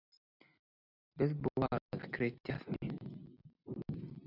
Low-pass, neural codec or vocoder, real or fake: 5.4 kHz; none; real